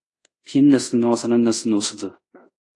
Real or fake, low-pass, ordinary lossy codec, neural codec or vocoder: fake; 10.8 kHz; AAC, 48 kbps; codec, 24 kHz, 0.5 kbps, DualCodec